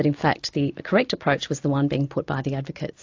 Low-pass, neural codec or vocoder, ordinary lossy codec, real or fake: 7.2 kHz; none; AAC, 48 kbps; real